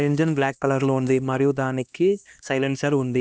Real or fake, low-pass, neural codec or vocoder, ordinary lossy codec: fake; none; codec, 16 kHz, 1 kbps, X-Codec, HuBERT features, trained on LibriSpeech; none